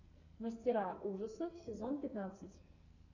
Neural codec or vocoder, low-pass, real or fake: codec, 44.1 kHz, 2.6 kbps, SNAC; 7.2 kHz; fake